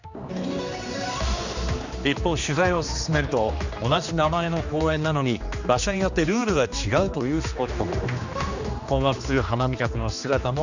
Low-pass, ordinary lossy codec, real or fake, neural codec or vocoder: 7.2 kHz; none; fake; codec, 16 kHz, 2 kbps, X-Codec, HuBERT features, trained on general audio